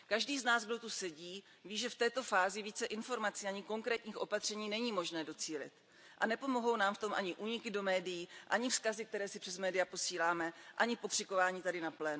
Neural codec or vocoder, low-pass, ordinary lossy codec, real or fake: none; none; none; real